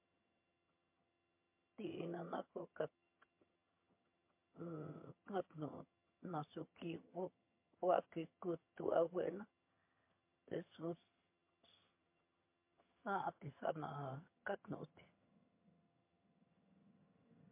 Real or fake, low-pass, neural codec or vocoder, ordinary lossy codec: fake; 3.6 kHz; vocoder, 22.05 kHz, 80 mel bands, HiFi-GAN; MP3, 24 kbps